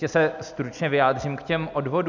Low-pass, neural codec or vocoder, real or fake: 7.2 kHz; none; real